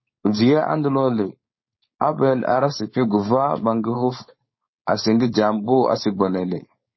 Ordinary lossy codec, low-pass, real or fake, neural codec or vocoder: MP3, 24 kbps; 7.2 kHz; fake; codec, 16 kHz, 4.8 kbps, FACodec